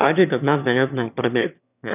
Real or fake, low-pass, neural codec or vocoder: fake; 3.6 kHz; autoencoder, 22.05 kHz, a latent of 192 numbers a frame, VITS, trained on one speaker